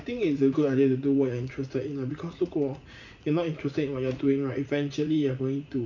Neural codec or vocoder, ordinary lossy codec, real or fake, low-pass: vocoder, 44.1 kHz, 80 mel bands, Vocos; none; fake; 7.2 kHz